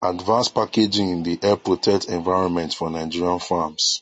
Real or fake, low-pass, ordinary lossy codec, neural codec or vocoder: real; 9.9 kHz; MP3, 32 kbps; none